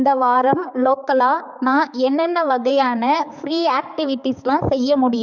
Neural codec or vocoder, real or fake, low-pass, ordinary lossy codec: codec, 16 kHz, 4 kbps, X-Codec, HuBERT features, trained on balanced general audio; fake; 7.2 kHz; none